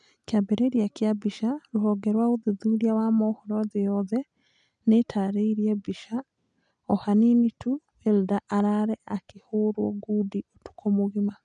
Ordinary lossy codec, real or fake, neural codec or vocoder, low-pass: none; real; none; 9.9 kHz